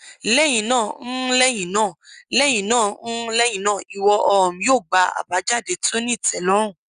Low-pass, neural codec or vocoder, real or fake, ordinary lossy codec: 9.9 kHz; none; real; Opus, 64 kbps